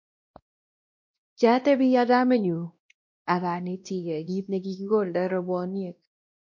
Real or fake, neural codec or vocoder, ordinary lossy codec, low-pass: fake; codec, 16 kHz, 1 kbps, X-Codec, WavLM features, trained on Multilingual LibriSpeech; MP3, 48 kbps; 7.2 kHz